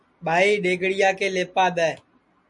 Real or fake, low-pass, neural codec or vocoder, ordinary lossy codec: real; 10.8 kHz; none; MP3, 48 kbps